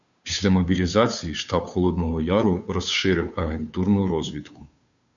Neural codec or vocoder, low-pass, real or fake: codec, 16 kHz, 2 kbps, FunCodec, trained on Chinese and English, 25 frames a second; 7.2 kHz; fake